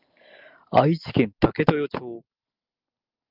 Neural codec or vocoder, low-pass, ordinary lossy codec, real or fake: none; 5.4 kHz; Opus, 24 kbps; real